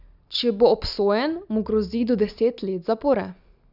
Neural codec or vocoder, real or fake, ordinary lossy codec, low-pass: none; real; none; 5.4 kHz